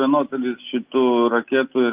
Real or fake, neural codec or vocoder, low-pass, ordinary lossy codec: real; none; 3.6 kHz; Opus, 32 kbps